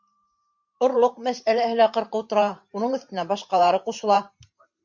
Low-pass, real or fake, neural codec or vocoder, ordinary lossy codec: 7.2 kHz; fake; vocoder, 44.1 kHz, 128 mel bands every 512 samples, BigVGAN v2; MP3, 64 kbps